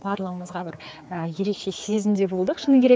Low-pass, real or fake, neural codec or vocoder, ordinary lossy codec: none; fake; codec, 16 kHz, 4 kbps, X-Codec, HuBERT features, trained on general audio; none